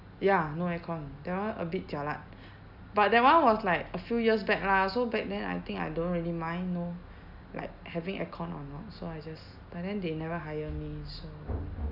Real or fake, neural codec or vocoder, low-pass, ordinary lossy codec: real; none; 5.4 kHz; none